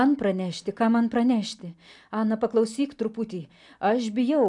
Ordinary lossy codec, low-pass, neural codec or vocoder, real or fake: MP3, 96 kbps; 10.8 kHz; none; real